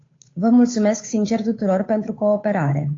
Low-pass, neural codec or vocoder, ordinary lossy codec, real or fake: 7.2 kHz; codec, 16 kHz, 8 kbps, FunCodec, trained on Chinese and English, 25 frames a second; AAC, 32 kbps; fake